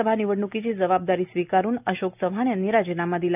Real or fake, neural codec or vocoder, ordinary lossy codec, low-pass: real; none; none; 3.6 kHz